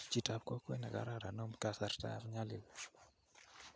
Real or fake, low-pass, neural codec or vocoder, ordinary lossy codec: real; none; none; none